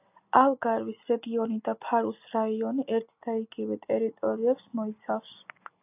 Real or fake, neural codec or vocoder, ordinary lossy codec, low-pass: real; none; AAC, 32 kbps; 3.6 kHz